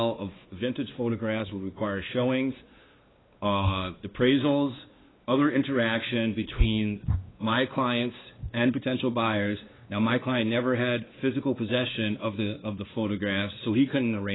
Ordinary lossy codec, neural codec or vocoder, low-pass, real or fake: AAC, 16 kbps; codec, 16 kHz, 2 kbps, X-Codec, WavLM features, trained on Multilingual LibriSpeech; 7.2 kHz; fake